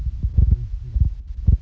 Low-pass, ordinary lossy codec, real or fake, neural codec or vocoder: none; none; real; none